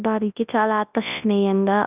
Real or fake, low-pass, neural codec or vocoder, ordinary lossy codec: fake; 3.6 kHz; codec, 24 kHz, 0.9 kbps, WavTokenizer, large speech release; none